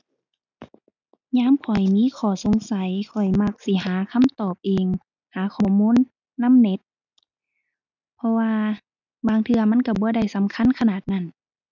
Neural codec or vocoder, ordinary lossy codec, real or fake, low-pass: none; none; real; 7.2 kHz